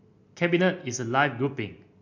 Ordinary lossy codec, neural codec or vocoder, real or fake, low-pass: MP3, 48 kbps; none; real; 7.2 kHz